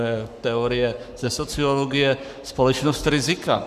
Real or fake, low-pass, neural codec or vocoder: fake; 14.4 kHz; codec, 44.1 kHz, 7.8 kbps, Pupu-Codec